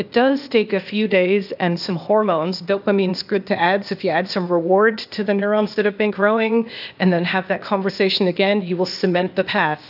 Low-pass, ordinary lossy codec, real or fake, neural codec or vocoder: 5.4 kHz; AAC, 48 kbps; fake; codec, 16 kHz, 0.8 kbps, ZipCodec